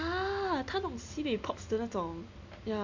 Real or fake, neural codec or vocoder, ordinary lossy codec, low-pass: real; none; MP3, 64 kbps; 7.2 kHz